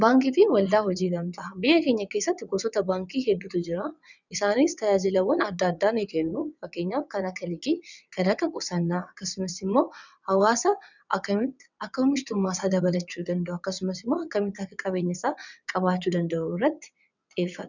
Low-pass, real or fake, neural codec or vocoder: 7.2 kHz; fake; vocoder, 22.05 kHz, 80 mel bands, WaveNeXt